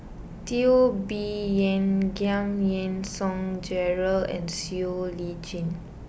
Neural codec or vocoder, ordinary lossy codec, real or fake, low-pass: none; none; real; none